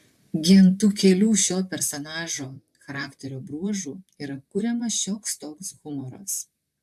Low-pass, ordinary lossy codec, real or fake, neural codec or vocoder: 14.4 kHz; AAC, 96 kbps; fake; vocoder, 44.1 kHz, 128 mel bands, Pupu-Vocoder